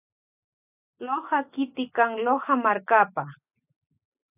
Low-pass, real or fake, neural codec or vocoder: 3.6 kHz; real; none